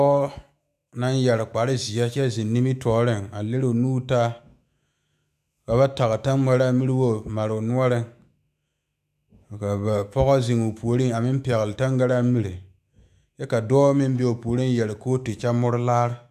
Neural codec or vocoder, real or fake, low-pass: autoencoder, 48 kHz, 128 numbers a frame, DAC-VAE, trained on Japanese speech; fake; 14.4 kHz